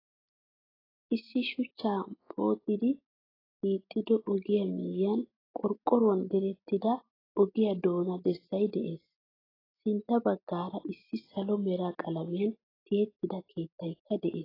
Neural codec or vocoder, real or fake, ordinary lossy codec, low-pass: none; real; AAC, 24 kbps; 5.4 kHz